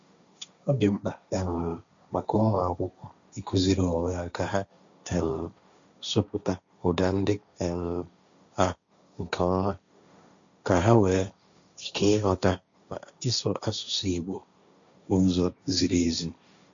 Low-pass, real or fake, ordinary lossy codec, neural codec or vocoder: 7.2 kHz; fake; MP3, 64 kbps; codec, 16 kHz, 1.1 kbps, Voila-Tokenizer